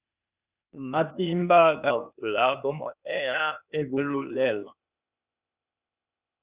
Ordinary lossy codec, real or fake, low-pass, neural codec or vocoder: Opus, 64 kbps; fake; 3.6 kHz; codec, 16 kHz, 0.8 kbps, ZipCodec